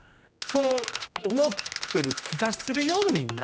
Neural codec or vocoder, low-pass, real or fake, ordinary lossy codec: codec, 16 kHz, 1 kbps, X-Codec, HuBERT features, trained on general audio; none; fake; none